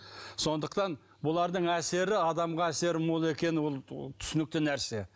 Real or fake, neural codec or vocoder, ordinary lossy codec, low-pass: real; none; none; none